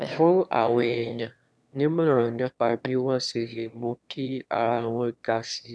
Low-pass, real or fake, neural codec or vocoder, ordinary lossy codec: none; fake; autoencoder, 22.05 kHz, a latent of 192 numbers a frame, VITS, trained on one speaker; none